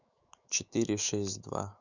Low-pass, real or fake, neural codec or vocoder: 7.2 kHz; fake; autoencoder, 48 kHz, 128 numbers a frame, DAC-VAE, trained on Japanese speech